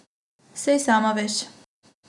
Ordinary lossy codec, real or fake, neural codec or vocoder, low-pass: none; real; none; 10.8 kHz